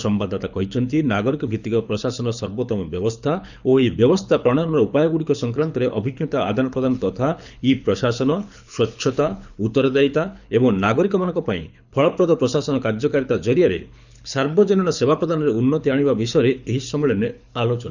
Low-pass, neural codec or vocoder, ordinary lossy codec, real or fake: 7.2 kHz; codec, 24 kHz, 6 kbps, HILCodec; none; fake